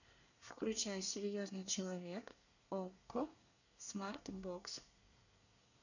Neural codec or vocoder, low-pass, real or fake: codec, 24 kHz, 1 kbps, SNAC; 7.2 kHz; fake